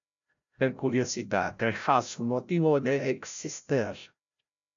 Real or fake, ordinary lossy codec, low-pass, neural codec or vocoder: fake; MP3, 64 kbps; 7.2 kHz; codec, 16 kHz, 0.5 kbps, FreqCodec, larger model